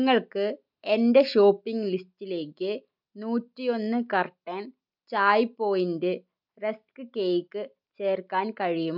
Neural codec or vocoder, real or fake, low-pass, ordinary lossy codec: none; real; 5.4 kHz; none